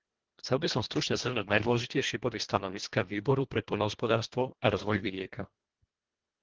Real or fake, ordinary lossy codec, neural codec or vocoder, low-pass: fake; Opus, 16 kbps; codec, 24 kHz, 1.5 kbps, HILCodec; 7.2 kHz